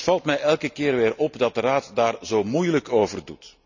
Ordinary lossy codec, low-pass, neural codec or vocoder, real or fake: none; 7.2 kHz; none; real